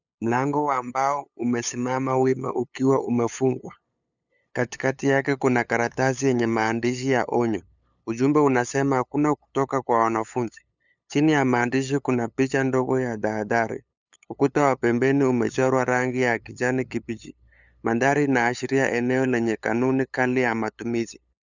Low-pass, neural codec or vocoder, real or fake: 7.2 kHz; codec, 16 kHz, 8 kbps, FunCodec, trained on LibriTTS, 25 frames a second; fake